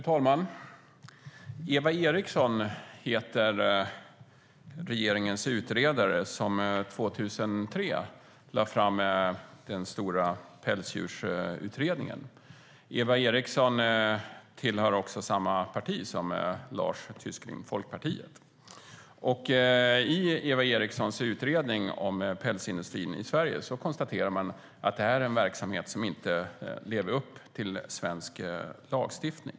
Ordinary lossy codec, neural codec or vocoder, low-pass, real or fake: none; none; none; real